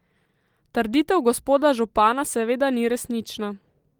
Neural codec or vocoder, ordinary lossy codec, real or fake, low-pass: none; Opus, 24 kbps; real; 19.8 kHz